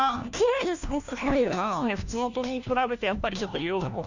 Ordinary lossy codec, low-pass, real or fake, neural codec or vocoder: none; 7.2 kHz; fake; codec, 16 kHz, 1 kbps, FunCodec, trained on LibriTTS, 50 frames a second